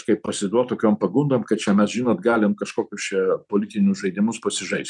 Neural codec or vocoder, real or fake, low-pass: none; real; 10.8 kHz